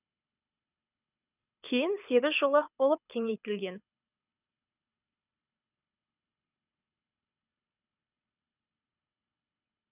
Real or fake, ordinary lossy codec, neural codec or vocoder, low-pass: fake; none; codec, 24 kHz, 6 kbps, HILCodec; 3.6 kHz